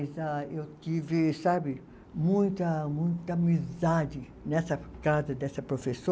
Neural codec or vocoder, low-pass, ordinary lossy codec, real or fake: none; none; none; real